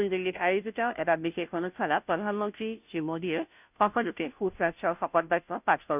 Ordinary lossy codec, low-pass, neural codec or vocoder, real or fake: none; 3.6 kHz; codec, 16 kHz, 0.5 kbps, FunCodec, trained on Chinese and English, 25 frames a second; fake